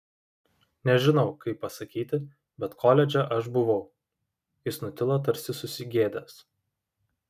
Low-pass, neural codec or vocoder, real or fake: 14.4 kHz; none; real